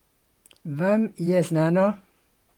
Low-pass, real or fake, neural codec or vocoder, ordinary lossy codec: 19.8 kHz; fake; vocoder, 44.1 kHz, 128 mel bands, Pupu-Vocoder; Opus, 24 kbps